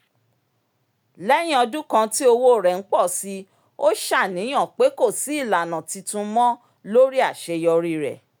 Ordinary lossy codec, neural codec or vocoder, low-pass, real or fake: none; none; none; real